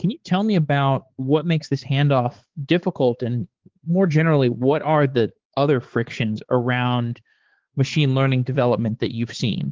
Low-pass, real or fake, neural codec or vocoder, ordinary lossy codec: 7.2 kHz; fake; codec, 16 kHz, 4 kbps, X-Codec, HuBERT features, trained on balanced general audio; Opus, 16 kbps